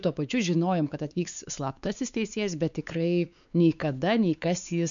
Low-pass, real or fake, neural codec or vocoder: 7.2 kHz; fake; codec, 16 kHz, 4 kbps, X-Codec, WavLM features, trained on Multilingual LibriSpeech